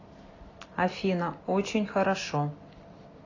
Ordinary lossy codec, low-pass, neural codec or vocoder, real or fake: AAC, 32 kbps; 7.2 kHz; none; real